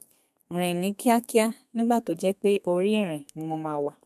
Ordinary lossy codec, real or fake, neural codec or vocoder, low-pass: MP3, 96 kbps; fake; codec, 32 kHz, 1.9 kbps, SNAC; 14.4 kHz